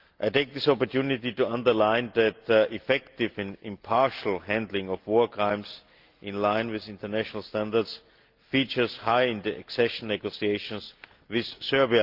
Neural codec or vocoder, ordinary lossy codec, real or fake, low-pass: none; Opus, 32 kbps; real; 5.4 kHz